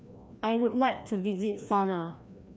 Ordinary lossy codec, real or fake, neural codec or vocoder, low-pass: none; fake; codec, 16 kHz, 1 kbps, FreqCodec, larger model; none